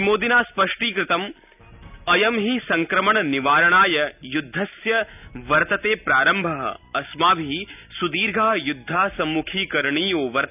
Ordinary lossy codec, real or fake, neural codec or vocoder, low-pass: none; real; none; 3.6 kHz